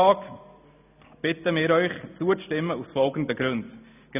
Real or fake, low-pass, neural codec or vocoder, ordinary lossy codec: real; 3.6 kHz; none; none